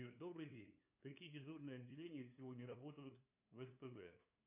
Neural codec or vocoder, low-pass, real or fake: codec, 16 kHz, 2 kbps, FunCodec, trained on LibriTTS, 25 frames a second; 3.6 kHz; fake